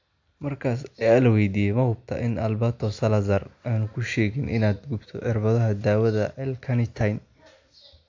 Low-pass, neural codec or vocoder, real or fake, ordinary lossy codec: 7.2 kHz; none; real; AAC, 48 kbps